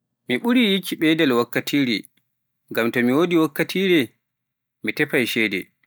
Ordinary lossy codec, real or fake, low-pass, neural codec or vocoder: none; real; none; none